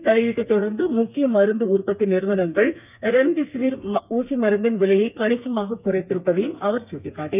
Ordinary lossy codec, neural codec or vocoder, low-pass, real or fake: none; codec, 32 kHz, 1.9 kbps, SNAC; 3.6 kHz; fake